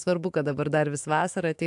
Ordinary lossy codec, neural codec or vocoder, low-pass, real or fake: AAC, 64 kbps; autoencoder, 48 kHz, 128 numbers a frame, DAC-VAE, trained on Japanese speech; 10.8 kHz; fake